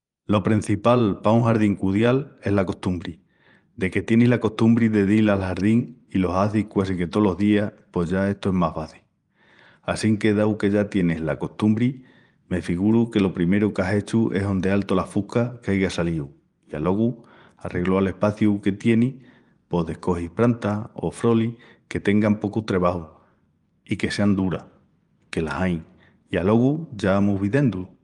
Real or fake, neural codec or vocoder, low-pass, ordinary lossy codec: fake; vocoder, 24 kHz, 100 mel bands, Vocos; 10.8 kHz; Opus, 32 kbps